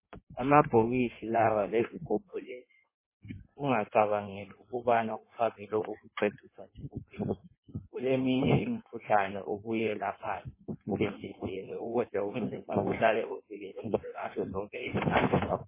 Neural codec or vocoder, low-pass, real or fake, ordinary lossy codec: codec, 16 kHz in and 24 kHz out, 1.1 kbps, FireRedTTS-2 codec; 3.6 kHz; fake; MP3, 16 kbps